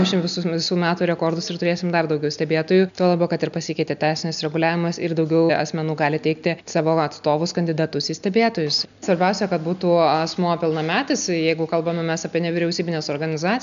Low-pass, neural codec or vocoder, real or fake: 7.2 kHz; none; real